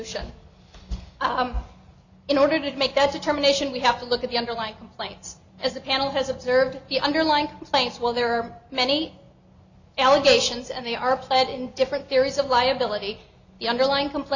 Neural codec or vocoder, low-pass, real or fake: none; 7.2 kHz; real